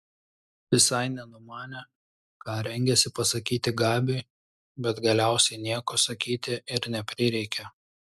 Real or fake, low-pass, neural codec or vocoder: real; 14.4 kHz; none